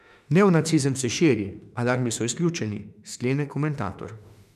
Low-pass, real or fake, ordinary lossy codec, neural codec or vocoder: 14.4 kHz; fake; none; autoencoder, 48 kHz, 32 numbers a frame, DAC-VAE, trained on Japanese speech